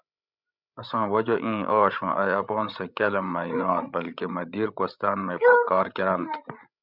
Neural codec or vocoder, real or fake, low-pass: codec, 16 kHz, 16 kbps, FreqCodec, larger model; fake; 5.4 kHz